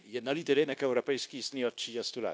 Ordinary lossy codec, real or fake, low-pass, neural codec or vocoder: none; fake; none; codec, 16 kHz, 0.9 kbps, LongCat-Audio-Codec